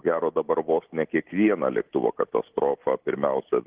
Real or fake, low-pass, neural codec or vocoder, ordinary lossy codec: real; 3.6 kHz; none; Opus, 16 kbps